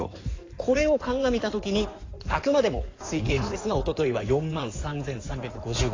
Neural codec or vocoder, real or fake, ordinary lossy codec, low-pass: codec, 16 kHz in and 24 kHz out, 2.2 kbps, FireRedTTS-2 codec; fake; AAC, 32 kbps; 7.2 kHz